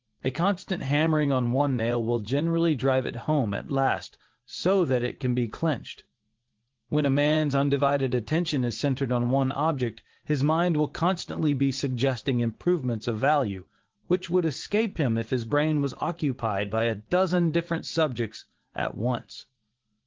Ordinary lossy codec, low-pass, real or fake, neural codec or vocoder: Opus, 16 kbps; 7.2 kHz; fake; vocoder, 44.1 kHz, 80 mel bands, Vocos